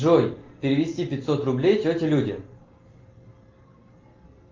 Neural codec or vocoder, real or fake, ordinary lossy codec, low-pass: none; real; Opus, 32 kbps; 7.2 kHz